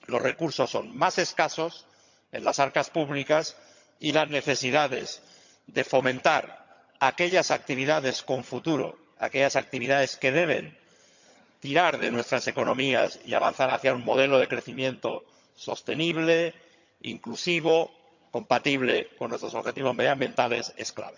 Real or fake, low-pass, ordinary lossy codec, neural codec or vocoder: fake; 7.2 kHz; none; vocoder, 22.05 kHz, 80 mel bands, HiFi-GAN